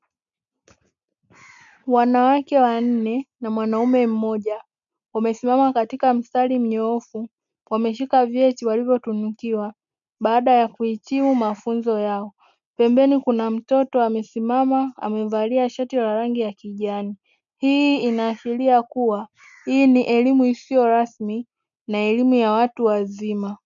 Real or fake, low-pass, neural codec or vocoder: real; 7.2 kHz; none